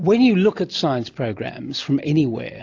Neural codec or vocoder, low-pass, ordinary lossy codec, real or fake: none; 7.2 kHz; AAC, 48 kbps; real